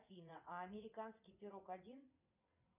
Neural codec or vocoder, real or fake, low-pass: vocoder, 22.05 kHz, 80 mel bands, WaveNeXt; fake; 3.6 kHz